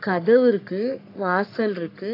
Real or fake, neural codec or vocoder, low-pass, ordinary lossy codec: fake; codec, 44.1 kHz, 3.4 kbps, Pupu-Codec; 5.4 kHz; AAC, 32 kbps